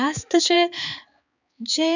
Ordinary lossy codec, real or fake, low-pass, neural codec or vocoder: none; fake; 7.2 kHz; codec, 16 kHz, 4 kbps, X-Codec, HuBERT features, trained on balanced general audio